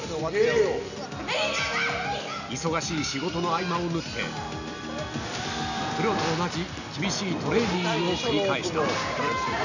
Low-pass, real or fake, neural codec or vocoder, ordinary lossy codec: 7.2 kHz; real; none; none